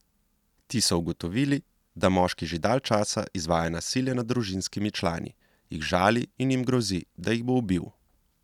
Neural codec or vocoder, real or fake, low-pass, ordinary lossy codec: none; real; 19.8 kHz; none